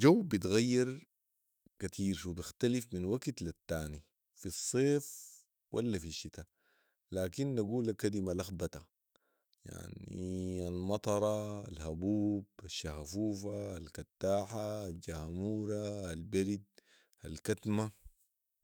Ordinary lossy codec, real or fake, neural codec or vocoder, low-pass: none; fake; autoencoder, 48 kHz, 128 numbers a frame, DAC-VAE, trained on Japanese speech; none